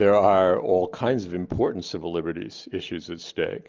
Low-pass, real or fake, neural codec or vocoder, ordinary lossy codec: 7.2 kHz; real; none; Opus, 24 kbps